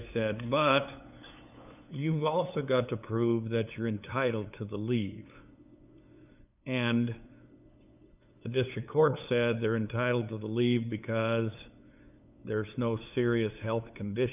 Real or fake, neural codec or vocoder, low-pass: fake; codec, 16 kHz, 8 kbps, FunCodec, trained on LibriTTS, 25 frames a second; 3.6 kHz